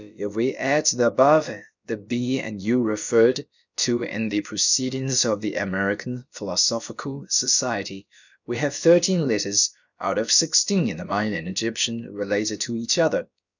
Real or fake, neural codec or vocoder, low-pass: fake; codec, 16 kHz, about 1 kbps, DyCAST, with the encoder's durations; 7.2 kHz